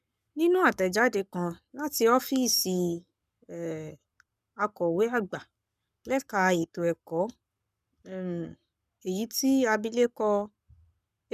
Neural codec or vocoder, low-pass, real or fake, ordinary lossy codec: codec, 44.1 kHz, 7.8 kbps, Pupu-Codec; 14.4 kHz; fake; none